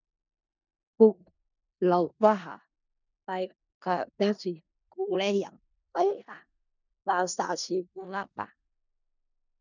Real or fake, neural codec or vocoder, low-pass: fake; codec, 16 kHz in and 24 kHz out, 0.4 kbps, LongCat-Audio-Codec, four codebook decoder; 7.2 kHz